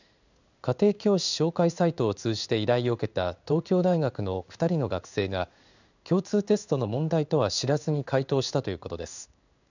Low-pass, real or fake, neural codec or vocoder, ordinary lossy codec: 7.2 kHz; fake; codec, 16 kHz in and 24 kHz out, 1 kbps, XY-Tokenizer; none